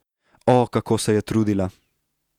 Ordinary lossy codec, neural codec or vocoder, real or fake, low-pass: none; vocoder, 48 kHz, 128 mel bands, Vocos; fake; 19.8 kHz